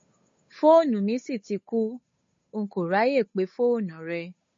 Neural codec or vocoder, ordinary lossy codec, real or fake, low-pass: codec, 16 kHz, 8 kbps, FunCodec, trained on Chinese and English, 25 frames a second; MP3, 32 kbps; fake; 7.2 kHz